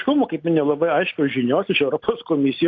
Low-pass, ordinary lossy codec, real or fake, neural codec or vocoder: 7.2 kHz; AAC, 48 kbps; real; none